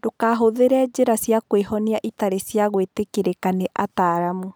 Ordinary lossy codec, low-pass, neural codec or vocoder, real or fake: none; none; none; real